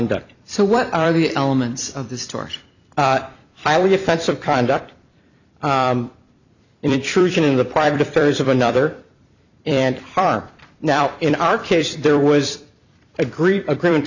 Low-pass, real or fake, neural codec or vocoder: 7.2 kHz; fake; vocoder, 44.1 kHz, 128 mel bands every 256 samples, BigVGAN v2